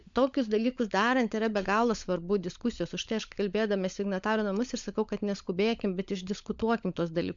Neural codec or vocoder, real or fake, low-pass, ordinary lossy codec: codec, 16 kHz, 16 kbps, FunCodec, trained on LibriTTS, 50 frames a second; fake; 7.2 kHz; MP3, 64 kbps